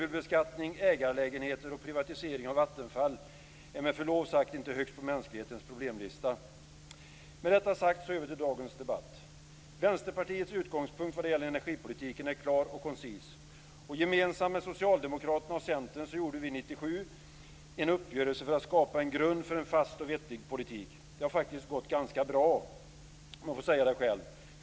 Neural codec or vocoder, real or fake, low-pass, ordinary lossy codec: none; real; none; none